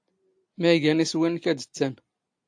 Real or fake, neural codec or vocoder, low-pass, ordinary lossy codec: real; none; 9.9 kHz; AAC, 64 kbps